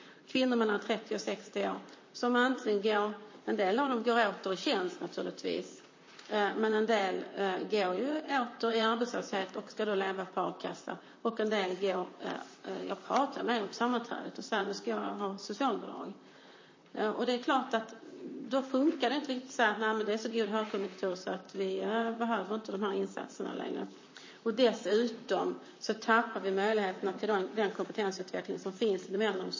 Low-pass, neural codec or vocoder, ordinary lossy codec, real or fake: 7.2 kHz; vocoder, 44.1 kHz, 128 mel bands, Pupu-Vocoder; MP3, 32 kbps; fake